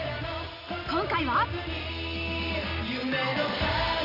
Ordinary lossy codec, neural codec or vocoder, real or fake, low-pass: AAC, 48 kbps; none; real; 5.4 kHz